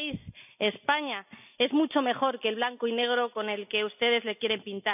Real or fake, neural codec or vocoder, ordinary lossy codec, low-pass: real; none; none; 3.6 kHz